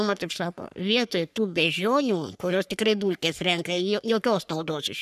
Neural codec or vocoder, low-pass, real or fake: codec, 44.1 kHz, 3.4 kbps, Pupu-Codec; 14.4 kHz; fake